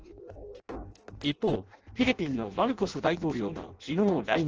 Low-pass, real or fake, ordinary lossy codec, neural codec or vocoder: 7.2 kHz; fake; Opus, 16 kbps; codec, 16 kHz in and 24 kHz out, 0.6 kbps, FireRedTTS-2 codec